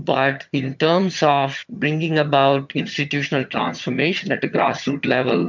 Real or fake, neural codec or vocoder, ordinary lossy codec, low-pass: fake; vocoder, 22.05 kHz, 80 mel bands, HiFi-GAN; MP3, 64 kbps; 7.2 kHz